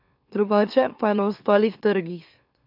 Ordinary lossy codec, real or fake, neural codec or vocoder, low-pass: none; fake; autoencoder, 44.1 kHz, a latent of 192 numbers a frame, MeloTTS; 5.4 kHz